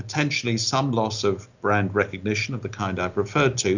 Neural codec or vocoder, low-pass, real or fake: none; 7.2 kHz; real